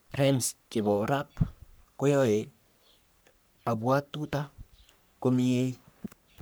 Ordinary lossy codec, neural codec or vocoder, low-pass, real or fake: none; codec, 44.1 kHz, 3.4 kbps, Pupu-Codec; none; fake